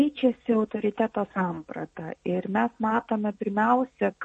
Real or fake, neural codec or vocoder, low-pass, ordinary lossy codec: real; none; 10.8 kHz; MP3, 32 kbps